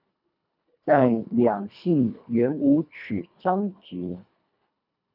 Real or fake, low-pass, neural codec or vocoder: fake; 5.4 kHz; codec, 24 kHz, 1.5 kbps, HILCodec